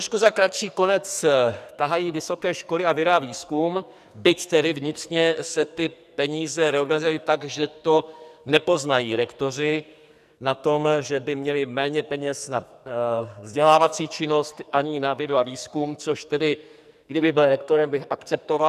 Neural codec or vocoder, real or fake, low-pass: codec, 44.1 kHz, 2.6 kbps, SNAC; fake; 14.4 kHz